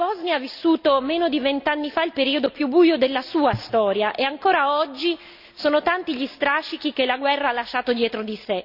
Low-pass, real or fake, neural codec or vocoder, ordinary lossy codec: 5.4 kHz; real; none; none